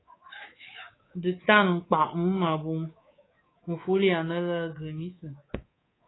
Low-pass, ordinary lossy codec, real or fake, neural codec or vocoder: 7.2 kHz; AAC, 16 kbps; fake; codec, 24 kHz, 3.1 kbps, DualCodec